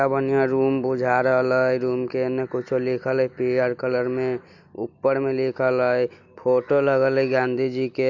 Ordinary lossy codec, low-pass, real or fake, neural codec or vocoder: none; 7.2 kHz; real; none